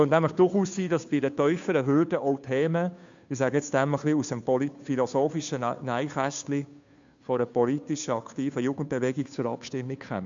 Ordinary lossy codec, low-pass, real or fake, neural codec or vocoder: MP3, 64 kbps; 7.2 kHz; fake; codec, 16 kHz, 2 kbps, FunCodec, trained on Chinese and English, 25 frames a second